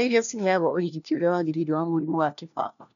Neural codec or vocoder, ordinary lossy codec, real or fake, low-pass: codec, 16 kHz, 1 kbps, FunCodec, trained on LibriTTS, 50 frames a second; none; fake; 7.2 kHz